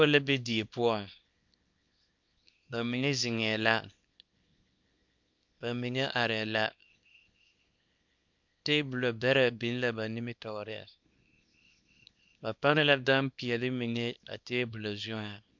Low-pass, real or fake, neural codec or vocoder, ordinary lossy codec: 7.2 kHz; fake; codec, 24 kHz, 0.9 kbps, WavTokenizer, small release; MP3, 64 kbps